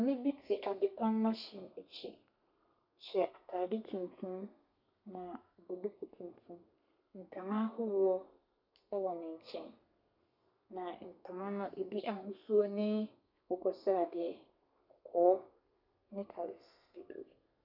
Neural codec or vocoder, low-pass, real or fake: codec, 32 kHz, 1.9 kbps, SNAC; 5.4 kHz; fake